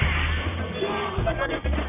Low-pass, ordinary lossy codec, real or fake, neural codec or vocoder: 3.6 kHz; none; fake; codec, 44.1 kHz, 1.7 kbps, Pupu-Codec